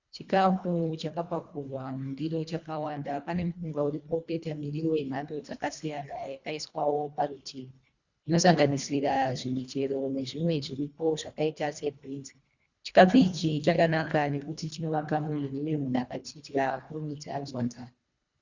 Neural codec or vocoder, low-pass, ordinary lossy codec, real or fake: codec, 24 kHz, 1.5 kbps, HILCodec; 7.2 kHz; Opus, 64 kbps; fake